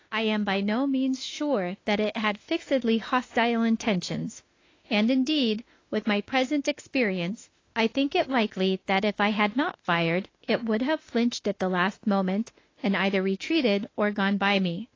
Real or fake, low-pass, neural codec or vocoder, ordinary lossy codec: fake; 7.2 kHz; autoencoder, 48 kHz, 32 numbers a frame, DAC-VAE, trained on Japanese speech; AAC, 32 kbps